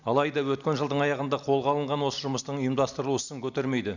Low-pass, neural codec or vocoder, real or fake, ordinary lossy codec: 7.2 kHz; none; real; none